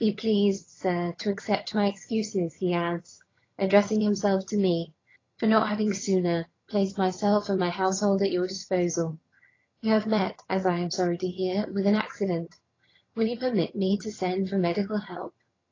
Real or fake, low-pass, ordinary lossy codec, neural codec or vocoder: fake; 7.2 kHz; AAC, 32 kbps; vocoder, 22.05 kHz, 80 mel bands, HiFi-GAN